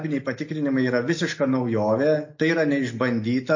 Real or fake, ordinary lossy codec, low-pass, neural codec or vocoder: real; MP3, 32 kbps; 7.2 kHz; none